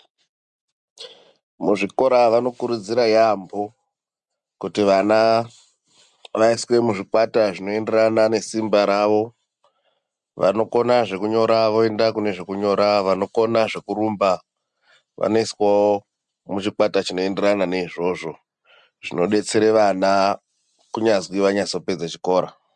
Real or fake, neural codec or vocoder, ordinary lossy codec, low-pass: real; none; MP3, 96 kbps; 10.8 kHz